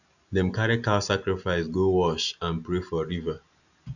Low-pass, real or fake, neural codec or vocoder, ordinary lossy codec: 7.2 kHz; real; none; none